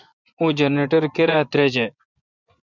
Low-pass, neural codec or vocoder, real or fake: 7.2 kHz; vocoder, 24 kHz, 100 mel bands, Vocos; fake